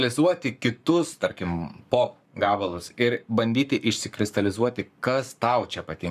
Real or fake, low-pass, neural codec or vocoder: fake; 14.4 kHz; codec, 44.1 kHz, 7.8 kbps, Pupu-Codec